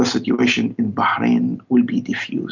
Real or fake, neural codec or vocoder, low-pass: real; none; 7.2 kHz